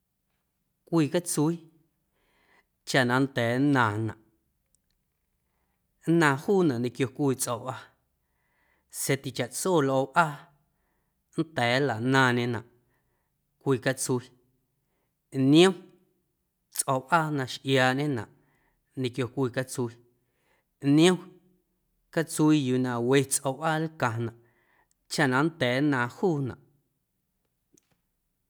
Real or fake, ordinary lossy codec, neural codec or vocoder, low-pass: real; none; none; none